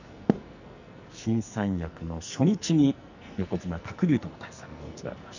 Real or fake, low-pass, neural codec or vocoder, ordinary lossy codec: fake; 7.2 kHz; codec, 44.1 kHz, 2.6 kbps, SNAC; none